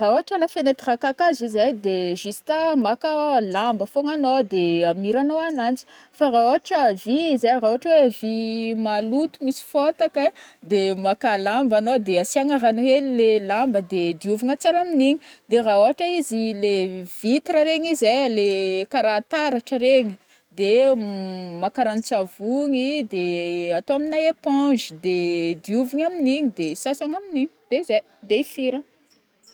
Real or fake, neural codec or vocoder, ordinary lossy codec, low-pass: fake; codec, 44.1 kHz, 7.8 kbps, DAC; none; none